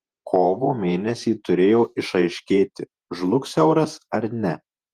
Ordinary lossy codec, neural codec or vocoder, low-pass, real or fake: Opus, 24 kbps; vocoder, 48 kHz, 128 mel bands, Vocos; 14.4 kHz; fake